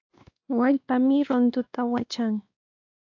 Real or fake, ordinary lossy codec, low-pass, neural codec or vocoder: fake; AAC, 48 kbps; 7.2 kHz; codec, 16 kHz, 2 kbps, X-Codec, WavLM features, trained on Multilingual LibriSpeech